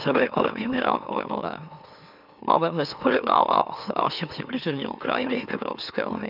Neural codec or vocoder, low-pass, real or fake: autoencoder, 44.1 kHz, a latent of 192 numbers a frame, MeloTTS; 5.4 kHz; fake